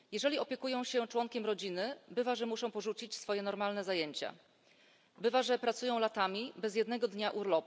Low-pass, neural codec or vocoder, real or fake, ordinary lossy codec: none; none; real; none